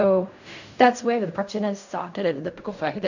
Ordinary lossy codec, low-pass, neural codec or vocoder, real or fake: none; 7.2 kHz; codec, 16 kHz in and 24 kHz out, 0.4 kbps, LongCat-Audio-Codec, fine tuned four codebook decoder; fake